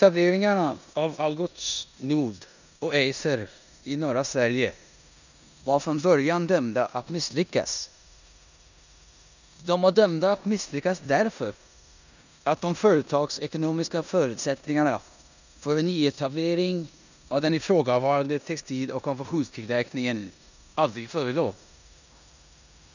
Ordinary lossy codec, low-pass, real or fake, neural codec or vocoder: none; 7.2 kHz; fake; codec, 16 kHz in and 24 kHz out, 0.9 kbps, LongCat-Audio-Codec, four codebook decoder